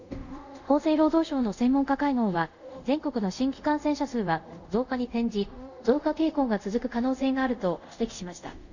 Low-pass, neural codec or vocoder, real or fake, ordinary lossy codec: 7.2 kHz; codec, 24 kHz, 0.5 kbps, DualCodec; fake; none